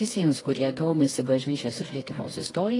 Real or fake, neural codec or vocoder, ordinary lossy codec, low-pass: fake; codec, 24 kHz, 0.9 kbps, WavTokenizer, medium music audio release; AAC, 32 kbps; 10.8 kHz